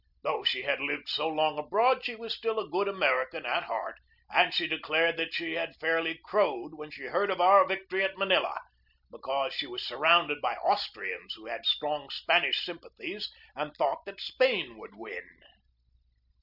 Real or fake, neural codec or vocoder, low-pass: real; none; 5.4 kHz